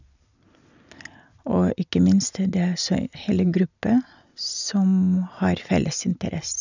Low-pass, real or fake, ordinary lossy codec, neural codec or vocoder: 7.2 kHz; real; none; none